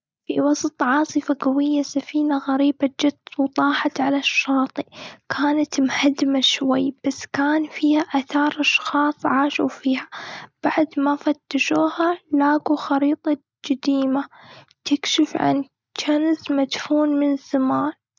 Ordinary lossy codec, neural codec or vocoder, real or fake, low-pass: none; none; real; none